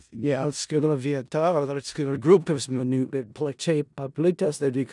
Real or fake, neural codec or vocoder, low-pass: fake; codec, 16 kHz in and 24 kHz out, 0.4 kbps, LongCat-Audio-Codec, four codebook decoder; 10.8 kHz